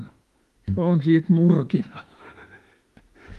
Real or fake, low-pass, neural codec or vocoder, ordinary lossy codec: fake; 14.4 kHz; autoencoder, 48 kHz, 32 numbers a frame, DAC-VAE, trained on Japanese speech; Opus, 24 kbps